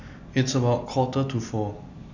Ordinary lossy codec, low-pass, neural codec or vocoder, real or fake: none; 7.2 kHz; none; real